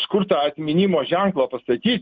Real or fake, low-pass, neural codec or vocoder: real; 7.2 kHz; none